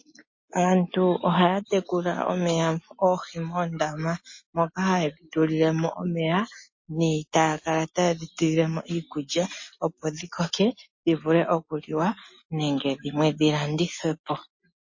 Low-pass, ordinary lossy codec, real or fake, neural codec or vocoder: 7.2 kHz; MP3, 32 kbps; real; none